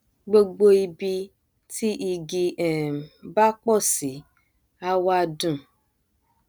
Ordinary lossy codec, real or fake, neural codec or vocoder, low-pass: none; real; none; none